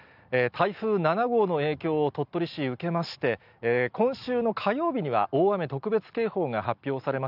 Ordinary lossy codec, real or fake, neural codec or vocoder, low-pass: none; real; none; 5.4 kHz